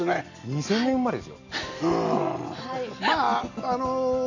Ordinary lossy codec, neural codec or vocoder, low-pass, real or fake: none; none; 7.2 kHz; real